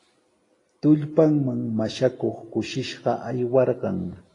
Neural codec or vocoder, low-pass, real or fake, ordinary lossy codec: none; 10.8 kHz; real; AAC, 32 kbps